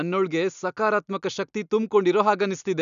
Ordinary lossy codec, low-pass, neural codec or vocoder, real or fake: none; 7.2 kHz; none; real